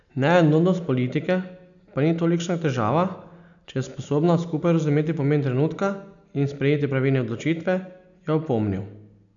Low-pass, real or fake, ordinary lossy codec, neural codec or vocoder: 7.2 kHz; real; none; none